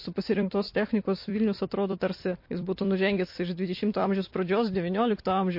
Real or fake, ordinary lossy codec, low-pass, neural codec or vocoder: fake; MP3, 32 kbps; 5.4 kHz; vocoder, 44.1 kHz, 128 mel bands every 256 samples, BigVGAN v2